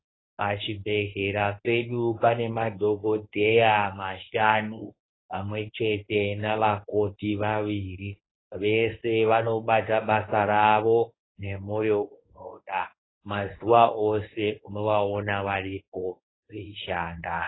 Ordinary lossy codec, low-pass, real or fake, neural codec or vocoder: AAC, 16 kbps; 7.2 kHz; fake; codec, 24 kHz, 0.9 kbps, WavTokenizer, medium speech release version 1